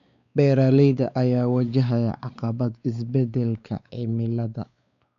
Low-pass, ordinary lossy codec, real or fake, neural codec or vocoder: 7.2 kHz; none; fake; codec, 16 kHz, 4 kbps, X-Codec, WavLM features, trained on Multilingual LibriSpeech